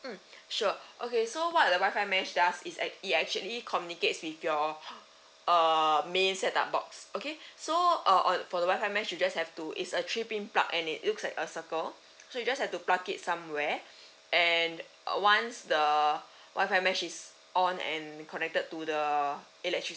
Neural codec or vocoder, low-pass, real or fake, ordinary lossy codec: none; none; real; none